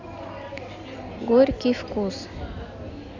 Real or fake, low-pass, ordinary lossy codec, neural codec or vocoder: real; 7.2 kHz; none; none